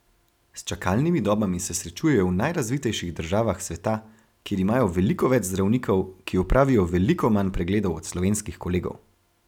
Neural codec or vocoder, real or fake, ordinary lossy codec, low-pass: none; real; none; 19.8 kHz